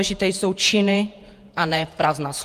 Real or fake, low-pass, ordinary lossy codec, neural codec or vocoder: fake; 14.4 kHz; Opus, 32 kbps; vocoder, 48 kHz, 128 mel bands, Vocos